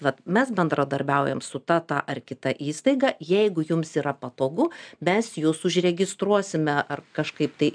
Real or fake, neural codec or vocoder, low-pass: real; none; 9.9 kHz